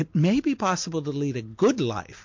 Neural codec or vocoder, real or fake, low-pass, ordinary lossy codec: none; real; 7.2 kHz; MP3, 48 kbps